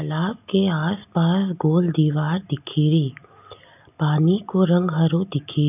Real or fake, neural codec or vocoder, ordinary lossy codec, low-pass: real; none; none; 3.6 kHz